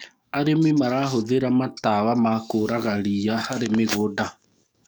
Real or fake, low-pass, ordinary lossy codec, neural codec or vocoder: fake; none; none; codec, 44.1 kHz, 7.8 kbps, DAC